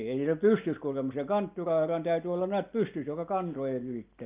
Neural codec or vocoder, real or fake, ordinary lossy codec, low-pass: none; real; Opus, 24 kbps; 3.6 kHz